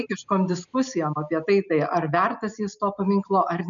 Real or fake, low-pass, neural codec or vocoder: real; 7.2 kHz; none